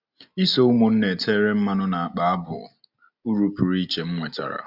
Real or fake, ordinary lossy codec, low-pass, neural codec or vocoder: real; Opus, 64 kbps; 5.4 kHz; none